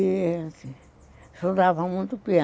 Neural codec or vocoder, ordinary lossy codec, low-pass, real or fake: none; none; none; real